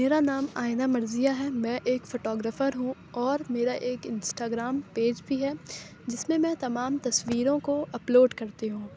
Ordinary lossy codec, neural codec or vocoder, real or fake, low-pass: none; none; real; none